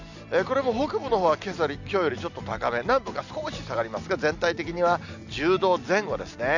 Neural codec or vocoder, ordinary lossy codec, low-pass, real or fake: none; none; 7.2 kHz; real